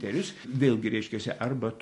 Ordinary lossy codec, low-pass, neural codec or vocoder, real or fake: MP3, 48 kbps; 14.4 kHz; none; real